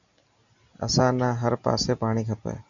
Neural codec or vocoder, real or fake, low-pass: none; real; 7.2 kHz